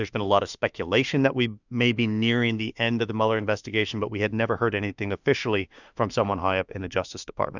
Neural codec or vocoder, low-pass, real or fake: autoencoder, 48 kHz, 32 numbers a frame, DAC-VAE, trained on Japanese speech; 7.2 kHz; fake